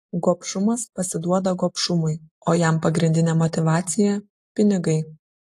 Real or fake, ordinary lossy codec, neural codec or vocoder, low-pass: real; AAC, 48 kbps; none; 14.4 kHz